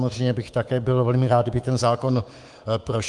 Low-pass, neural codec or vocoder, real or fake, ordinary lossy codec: 10.8 kHz; codec, 24 kHz, 3.1 kbps, DualCodec; fake; Opus, 24 kbps